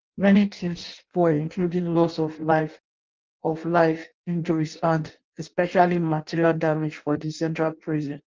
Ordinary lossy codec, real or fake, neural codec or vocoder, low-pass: Opus, 32 kbps; fake; codec, 16 kHz in and 24 kHz out, 0.6 kbps, FireRedTTS-2 codec; 7.2 kHz